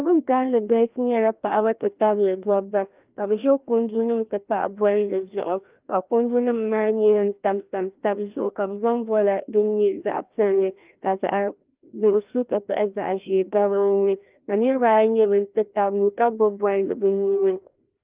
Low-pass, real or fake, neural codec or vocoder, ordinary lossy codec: 3.6 kHz; fake; codec, 16 kHz, 1 kbps, FreqCodec, larger model; Opus, 24 kbps